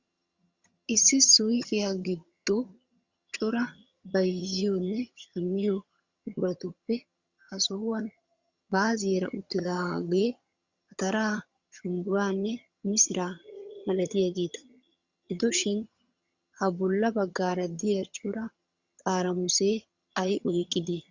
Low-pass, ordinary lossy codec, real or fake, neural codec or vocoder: 7.2 kHz; Opus, 64 kbps; fake; vocoder, 22.05 kHz, 80 mel bands, HiFi-GAN